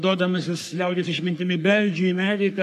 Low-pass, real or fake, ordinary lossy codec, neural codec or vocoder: 14.4 kHz; fake; AAC, 96 kbps; codec, 44.1 kHz, 3.4 kbps, Pupu-Codec